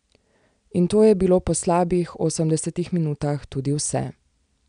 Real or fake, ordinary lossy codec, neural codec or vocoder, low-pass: real; none; none; 9.9 kHz